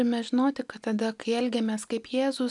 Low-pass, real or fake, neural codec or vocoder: 10.8 kHz; real; none